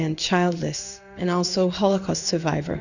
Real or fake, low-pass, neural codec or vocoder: fake; 7.2 kHz; codec, 16 kHz in and 24 kHz out, 1 kbps, XY-Tokenizer